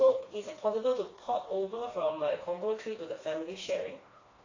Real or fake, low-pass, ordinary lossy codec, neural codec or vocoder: fake; 7.2 kHz; AAC, 32 kbps; codec, 16 kHz, 2 kbps, FreqCodec, smaller model